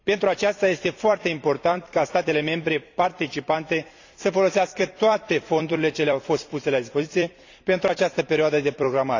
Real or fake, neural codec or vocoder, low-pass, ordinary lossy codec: fake; vocoder, 44.1 kHz, 128 mel bands every 256 samples, BigVGAN v2; 7.2 kHz; AAC, 48 kbps